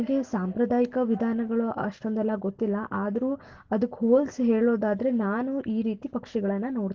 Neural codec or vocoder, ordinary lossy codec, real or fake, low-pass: none; Opus, 16 kbps; real; 7.2 kHz